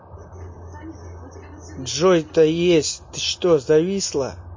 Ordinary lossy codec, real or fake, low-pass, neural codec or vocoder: MP3, 32 kbps; real; 7.2 kHz; none